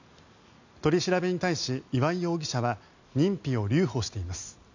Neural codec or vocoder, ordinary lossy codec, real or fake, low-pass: none; none; real; 7.2 kHz